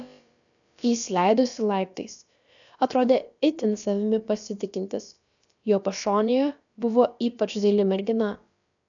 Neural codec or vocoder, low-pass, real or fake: codec, 16 kHz, about 1 kbps, DyCAST, with the encoder's durations; 7.2 kHz; fake